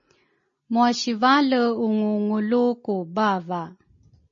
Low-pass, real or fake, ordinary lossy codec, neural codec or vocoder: 7.2 kHz; real; MP3, 32 kbps; none